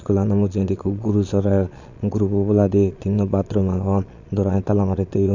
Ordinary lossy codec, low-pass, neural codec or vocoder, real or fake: none; 7.2 kHz; vocoder, 22.05 kHz, 80 mel bands, Vocos; fake